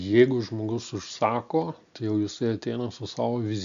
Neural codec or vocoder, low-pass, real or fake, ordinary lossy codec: none; 7.2 kHz; real; MP3, 64 kbps